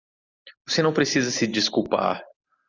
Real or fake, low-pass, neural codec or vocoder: real; 7.2 kHz; none